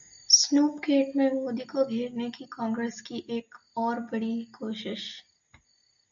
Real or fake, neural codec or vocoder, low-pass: real; none; 7.2 kHz